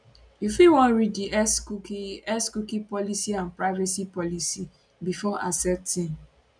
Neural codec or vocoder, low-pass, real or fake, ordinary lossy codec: none; 9.9 kHz; real; none